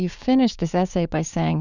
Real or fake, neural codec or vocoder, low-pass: fake; codec, 16 kHz, 6 kbps, DAC; 7.2 kHz